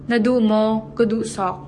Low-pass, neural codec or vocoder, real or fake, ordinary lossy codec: 10.8 kHz; codec, 44.1 kHz, 7.8 kbps, DAC; fake; MP3, 48 kbps